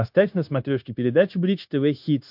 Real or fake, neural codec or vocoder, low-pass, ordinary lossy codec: fake; codec, 16 kHz, 0.9 kbps, LongCat-Audio-Codec; 5.4 kHz; MP3, 48 kbps